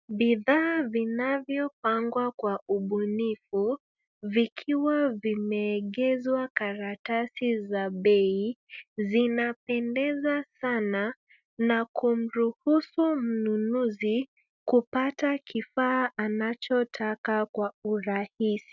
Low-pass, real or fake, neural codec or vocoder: 7.2 kHz; real; none